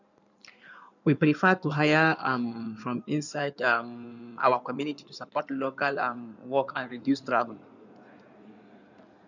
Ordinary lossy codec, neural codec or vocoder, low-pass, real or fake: none; codec, 16 kHz in and 24 kHz out, 2.2 kbps, FireRedTTS-2 codec; 7.2 kHz; fake